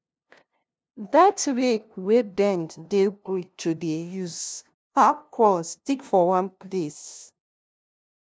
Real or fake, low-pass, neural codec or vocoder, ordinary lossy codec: fake; none; codec, 16 kHz, 0.5 kbps, FunCodec, trained on LibriTTS, 25 frames a second; none